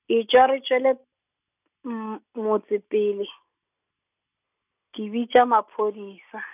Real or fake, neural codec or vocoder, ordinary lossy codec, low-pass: real; none; none; 3.6 kHz